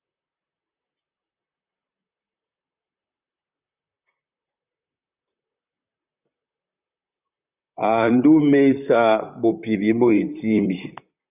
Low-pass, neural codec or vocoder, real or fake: 3.6 kHz; vocoder, 44.1 kHz, 128 mel bands, Pupu-Vocoder; fake